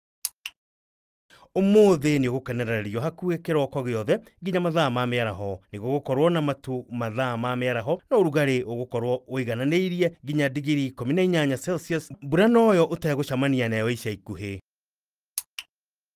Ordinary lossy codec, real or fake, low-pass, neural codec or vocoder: Opus, 32 kbps; real; 14.4 kHz; none